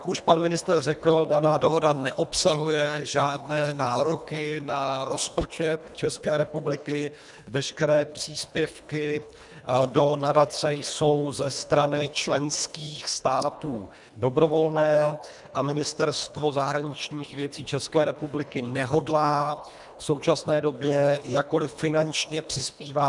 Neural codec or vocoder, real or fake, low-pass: codec, 24 kHz, 1.5 kbps, HILCodec; fake; 10.8 kHz